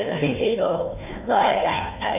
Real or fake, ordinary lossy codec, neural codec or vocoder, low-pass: fake; none; codec, 16 kHz, 1 kbps, FunCodec, trained on Chinese and English, 50 frames a second; 3.6 kHz